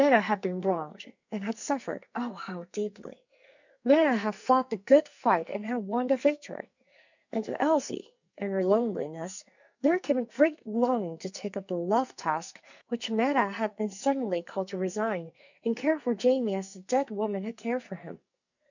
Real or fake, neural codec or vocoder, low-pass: fake; codec, 44.1 kHz, 2.6 kbps, SNAC; 7.2 kHz